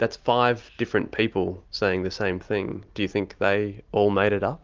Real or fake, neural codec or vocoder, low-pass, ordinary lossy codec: real; none; 7.2 kHz; Opus, 32 kbps